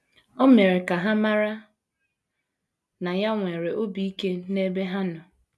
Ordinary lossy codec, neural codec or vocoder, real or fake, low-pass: none; none; real; none